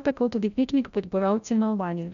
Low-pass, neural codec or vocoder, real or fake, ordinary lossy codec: 7.2 kHz; codec, 16 kHz, 0.5 kbps, FreqCodec, larger model; fake; none